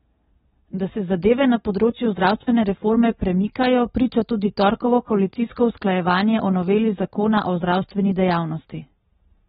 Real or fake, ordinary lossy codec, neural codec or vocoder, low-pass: real; AAC, 16 kbps; none; 19.8 kHz